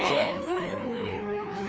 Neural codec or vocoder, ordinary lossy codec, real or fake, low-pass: codec, 16 kHz, 2 kbps, FreqCodec, larger model; none; fake; none